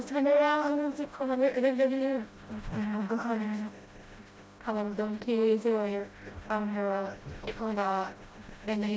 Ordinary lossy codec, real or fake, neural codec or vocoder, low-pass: none; fake; codec, 16 kHz, 0.5 kbps, FreqCodec, smaller model; none